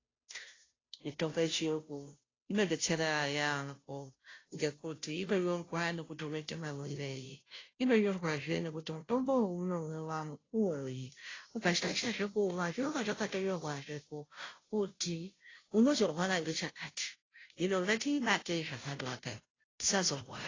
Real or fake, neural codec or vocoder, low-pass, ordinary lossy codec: fake; codec, 16 kHz, 0.5 kbps, FunCodec, trained on Chinese and English, 25 frames a second; 7.2 kHz; AAC, 32 kbps